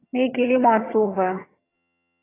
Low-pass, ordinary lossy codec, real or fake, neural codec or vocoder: 3.6 kHz; AAC, 16 kbps; fake; vocoder, 22.05 kHz, 80 mel bands, HiFi-GAN